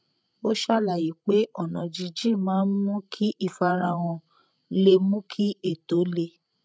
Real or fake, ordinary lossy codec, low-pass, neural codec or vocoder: fake; none; none; codec, 16 kHz, 16 kbps, FreqCodec, larger model